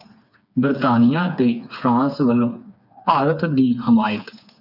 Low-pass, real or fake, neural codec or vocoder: 5.4 kHz; fake; codec, 16 kHz, 4 kbps, FreqCodec, smaller model